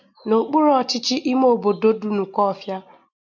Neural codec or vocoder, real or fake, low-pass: none; real; 7.2 kHz